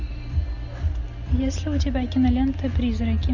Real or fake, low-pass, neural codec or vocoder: real; 7.2 kHz; none